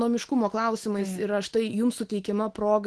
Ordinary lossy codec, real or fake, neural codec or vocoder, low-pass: Opus, 16 kbps; fake; autoencoder, 48 kHz, 128 numbers a frame, DAC-VAE, trained on Japanese speech; 10.8 kHz